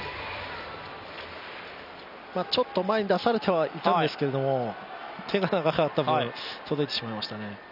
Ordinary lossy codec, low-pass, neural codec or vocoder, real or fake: none; 5.4 kHz; none; real